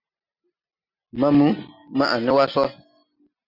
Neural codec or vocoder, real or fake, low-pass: none; real; 5.4 kHz